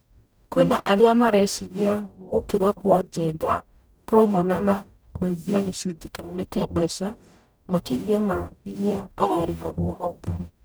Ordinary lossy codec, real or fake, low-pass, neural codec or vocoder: none; fake; none; codec, 44.1 kHz, 0.9 kbps, DAC